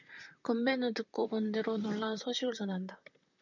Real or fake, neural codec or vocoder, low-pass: fake; vocoder, 44.1 kHz, 128 mel bands, Pupu-Vocoder; 7.2 kHz